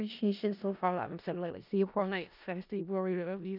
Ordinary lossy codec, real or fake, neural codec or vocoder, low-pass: none; fake; codec, 16 kHz in and 24 kHz out, 0.4 kbps, LongCat-Audio-Codec, four codebook decoder; 5.4 kHz